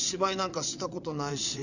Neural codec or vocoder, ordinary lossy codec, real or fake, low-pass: vocoder, 22.05 kHz, 80 mel bands, WaveNeXt; none; fake; 7.2 kHz